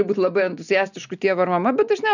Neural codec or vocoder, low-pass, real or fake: none; 7.2 kHz; real